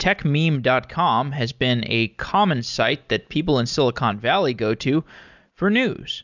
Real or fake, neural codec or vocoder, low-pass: real; none; 7.2 kHz